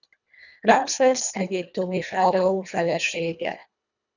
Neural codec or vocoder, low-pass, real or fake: codec, 24 kHz, 1.5 kbps, HILCodec; 7.2 kHz; fake